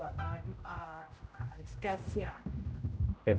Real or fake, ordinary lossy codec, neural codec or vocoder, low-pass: fake; none; codec, 16 kHz, 0.5 kbps, X-Codec, HuBERT features, trained on general audio; none